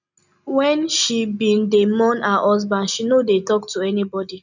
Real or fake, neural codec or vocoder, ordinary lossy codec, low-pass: real; none; none; 7.2 kHz